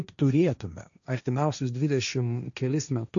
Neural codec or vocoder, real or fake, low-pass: codec, 16 kHz, 1.1 kbps, Voila-Tokenizer; fake; 7.2 kHz